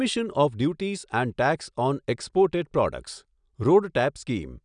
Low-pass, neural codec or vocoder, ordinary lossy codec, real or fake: 9.9 kHz; none; none; real